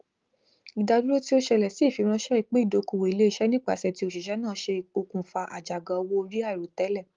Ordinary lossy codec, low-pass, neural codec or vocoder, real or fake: Opus, 32 kbps; 7.2 kHz; none; real